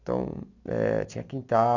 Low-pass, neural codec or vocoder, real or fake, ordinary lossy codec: 7.2 kHz; none; real; none